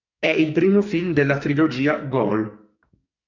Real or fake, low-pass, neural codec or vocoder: fake; 7.2 kHz; codec, 44.1 kHz, 2.6 kbps, SNAC